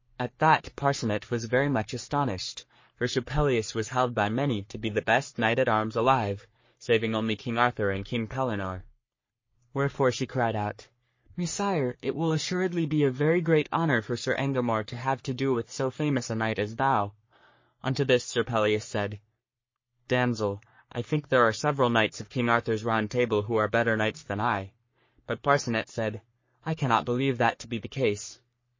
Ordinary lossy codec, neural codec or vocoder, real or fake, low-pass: MP3, 32 kbps; codec, 44.1 kHz, 3.4 kbps, Pupu-Codec; fake; 7.2 kHz